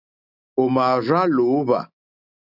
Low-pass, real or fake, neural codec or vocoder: 5.4 kHz; real; none